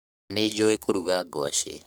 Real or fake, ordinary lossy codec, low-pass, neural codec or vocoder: fake; none; none; codec, 44.1 kHz, 3.4 kbps, Pupu-Codec